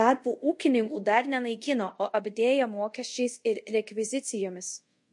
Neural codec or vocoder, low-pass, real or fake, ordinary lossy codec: codec, 24 kHz, 0.5 kbps, DualCodec; 10.8 kHz; fake; MP3, 48 kbps